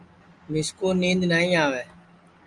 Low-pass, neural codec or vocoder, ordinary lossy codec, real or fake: 10.8 kHz; none; Opus, 32 kbps; real